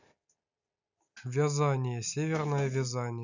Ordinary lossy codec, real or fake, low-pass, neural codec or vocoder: none; real; 7.2 kHz; none